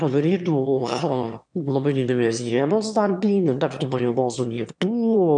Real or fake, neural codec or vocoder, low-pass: fake; autoencoder, 22.05 kHz, a latent of 192 numbers a frame, VITS, trained on one speaker; 9.9 kHz